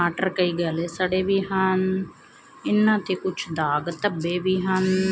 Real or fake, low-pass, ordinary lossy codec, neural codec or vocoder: real; none; none; none